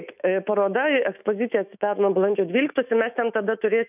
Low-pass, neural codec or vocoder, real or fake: 3.6 kHz; autoencoder, 48 kHz, 128 numbers a frame, DAC-VAE, trained on Japanese speech; fake